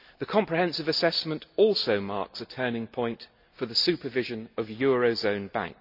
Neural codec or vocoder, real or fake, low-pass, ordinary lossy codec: none; real; 5.4 kHz; none